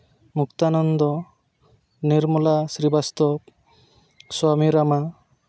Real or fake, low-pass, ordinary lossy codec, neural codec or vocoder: real; none; none; none